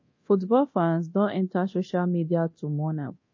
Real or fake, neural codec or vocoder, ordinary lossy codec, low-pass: fake; codec, 24 kHz, 0.9 kbps, DualCodec; MP3, 32 kbps; 7.2 kHz